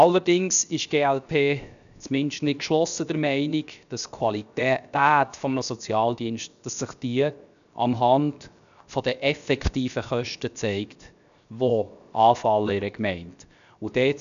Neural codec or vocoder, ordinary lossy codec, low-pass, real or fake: codec, 16 kHz, 0.7 kbps, FocalCodec; none; 7.2 kHz; fake